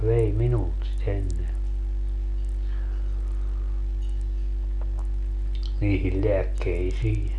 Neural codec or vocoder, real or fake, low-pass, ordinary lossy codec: none; real; 10.8 kHz; none